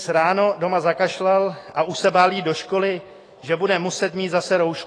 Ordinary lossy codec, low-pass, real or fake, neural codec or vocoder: AAC, 32 kbps; 9.9 kHz; fake; autoencoder, 48 kHz, 128 numbers a frame, DAC-VAE, trained on Japanese speech